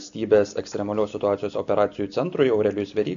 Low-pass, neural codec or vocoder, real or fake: 7.2 kHz; none; real